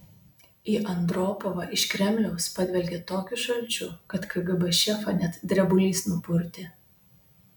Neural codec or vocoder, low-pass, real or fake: none; 19.8 kHz; real